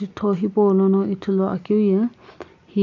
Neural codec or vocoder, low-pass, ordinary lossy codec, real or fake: none; 7.2 kHz; AAC, 48 kbps; real